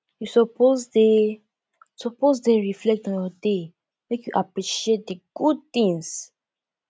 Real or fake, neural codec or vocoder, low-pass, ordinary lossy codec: real; none; none; none